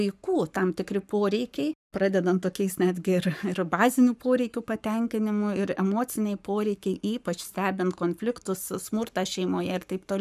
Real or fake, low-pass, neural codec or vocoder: fake; 14.4 kHz; codec, 44.1 kHz, 7.8 kbps, Pupu-Codec